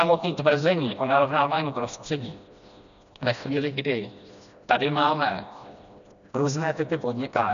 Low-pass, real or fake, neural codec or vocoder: 7.2 kHz; fake; codec, 16 kHz, 1 kbps, FreqCodec, smaller model